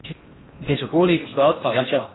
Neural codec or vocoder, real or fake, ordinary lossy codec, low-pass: codec, 16 kHz in and 24 kHz out, 0.6 kbps, FocalCodec, streaming, 4096 codes; fake; AAC, 16 kbps; 7.2 kHz